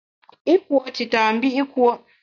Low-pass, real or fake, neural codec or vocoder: 7.2 kHz; real; none